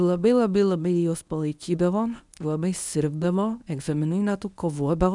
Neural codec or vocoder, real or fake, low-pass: codec, 24 kHz, 0.9 kbps, WavTokenizer, medium speech release version 1; fake; 10.8 kHz